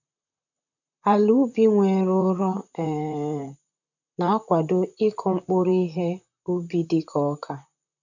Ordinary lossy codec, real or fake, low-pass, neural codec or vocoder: none; fake; 7.2 kHz; vocoder, 44.1 kHz, 128 mel bands, Pupu-Vocoder